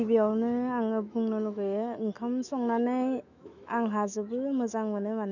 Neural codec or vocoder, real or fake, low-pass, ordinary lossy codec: autoencoder, 48 kHz, 128 numbers a frame, DAC-VAE, trained on Japanese speech; fake; 7.2 kHz; none